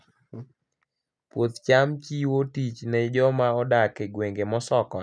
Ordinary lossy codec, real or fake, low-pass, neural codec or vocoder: none; real; 9.9 kHz; none